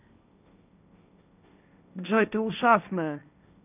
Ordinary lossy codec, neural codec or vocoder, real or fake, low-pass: none; codec, 16 kHz, 1.1 kbps, Voila-Tokenizer; fake; 3.6 kHz